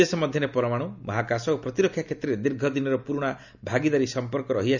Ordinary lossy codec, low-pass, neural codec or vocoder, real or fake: none; 7.2 kHz; none; real